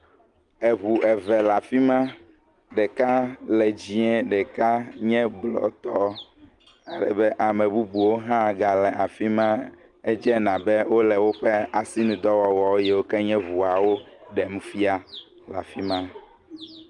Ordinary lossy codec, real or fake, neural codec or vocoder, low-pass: Opus, 32 kbps; real; none; 10.8 kHz